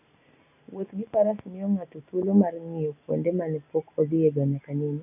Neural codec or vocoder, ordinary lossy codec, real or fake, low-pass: none; none; real; 3.6 kHz